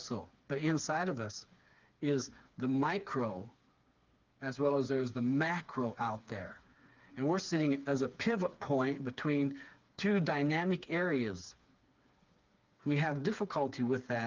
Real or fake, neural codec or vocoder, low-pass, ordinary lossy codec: fake; codec, 16 kHz, 4 kbps, FreqCodec, smaller model; 7.2 kHz; Opus, 32 kbps